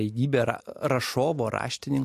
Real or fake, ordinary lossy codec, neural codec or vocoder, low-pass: real; MP3, 64 kbps; none; 14.4 kHz